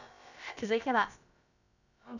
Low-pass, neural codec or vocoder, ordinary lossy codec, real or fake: 7.2 kHz; codec, 16 kHz, about 1 kbps, DyCAST, with the encoder's durations; none; fake